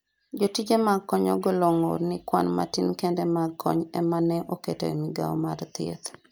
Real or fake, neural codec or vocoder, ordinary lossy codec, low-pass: real; none; none; none